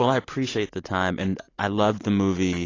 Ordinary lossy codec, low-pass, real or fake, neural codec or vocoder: AAC, 32 kbps; 7.2 kHz; real; none